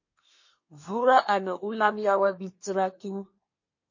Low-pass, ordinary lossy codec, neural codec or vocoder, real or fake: 7.2 kHz; MP3, 32 kbps; codec, 24 kHz, 1 kbps, SNAC; fake